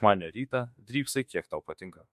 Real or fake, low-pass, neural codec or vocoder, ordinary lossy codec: fake; 14.4 kHz; autoencoder, 48 kHz, 32 numbers a frame, DAC-VAE, trained on Japanese speech; MP3, 64 kbps